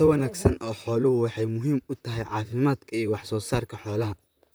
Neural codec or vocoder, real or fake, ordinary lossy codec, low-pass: vocoder, 44.1 kHz, 128 mel bands, Pupu-Vocoder; fake; none; none